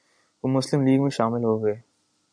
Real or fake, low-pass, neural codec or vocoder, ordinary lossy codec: real; 9.9 kHz; none; MP3, 96 kbps